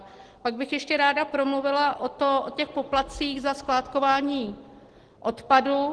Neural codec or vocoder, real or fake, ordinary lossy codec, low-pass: none; real; Opus, 16 kbps; 10.8 kHz